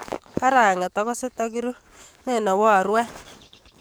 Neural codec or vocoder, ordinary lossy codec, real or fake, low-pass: codec, 44.1 kHz, 7.8 kbps, DAC; none; fake; none